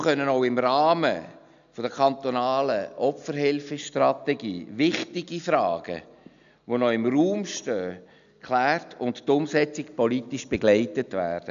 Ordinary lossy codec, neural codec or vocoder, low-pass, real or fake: none; none; 7.2 kHz; real